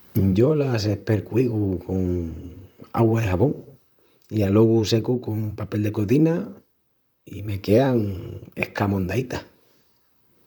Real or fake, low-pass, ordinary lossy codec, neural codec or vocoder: fake; none; none; vocoder, 44.1 kHz, 128 mel bands, Pupu-Vocoder